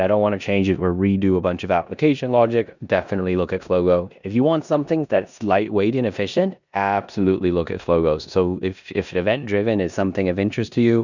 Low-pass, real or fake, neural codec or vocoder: 7.2 kHz; fake; codec, 16 kHz in and 24 kHz out, 0.9 kbps, LongCat-Audio-Codec, four codebook decoder